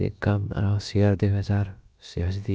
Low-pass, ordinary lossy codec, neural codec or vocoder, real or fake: none; none; codec, 16 kHz, about 1 kbps, DyCAST, with the encoder's durations; fake